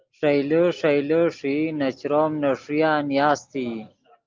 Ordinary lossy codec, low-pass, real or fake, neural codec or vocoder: Opus, 32 kbps; 7.2 kHz; real; none